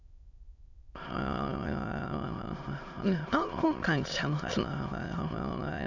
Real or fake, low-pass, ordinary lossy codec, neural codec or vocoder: fake; 7.2 kHz; none; autoencoder, 22.05 kHz, a latent of 192 numbers a frame, VITS, trained on many speakers